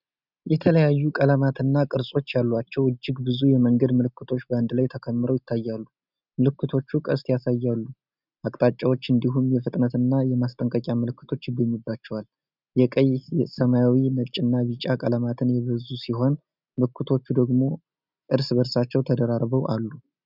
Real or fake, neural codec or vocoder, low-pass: real; none; 5.4 kHz